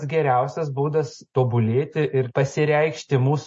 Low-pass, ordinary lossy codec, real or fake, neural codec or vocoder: 9.9 kHz; MP3, 32 kbps; real; none